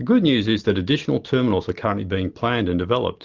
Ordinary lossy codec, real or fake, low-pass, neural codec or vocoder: Opus, 32 kbps; real; 7.2 kHz; none